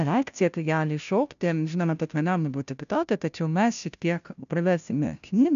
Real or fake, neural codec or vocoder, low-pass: fake; codec, 16 kHz, 0.5 kbps, FunCodec, trained on Chinese and English, 25 frames a second; 7.2 kHz